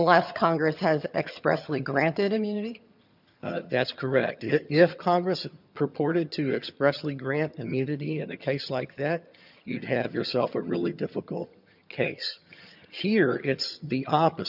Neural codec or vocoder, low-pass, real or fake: vocoder, 22.05 kHz, 80 mel bands, HiFi-GAN; 5.4 kHz; fake